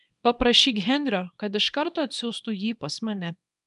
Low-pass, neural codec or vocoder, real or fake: 10.8 kHz; codec, 24 kHz, 0.9 kbps, WavTokenizer, small release; fake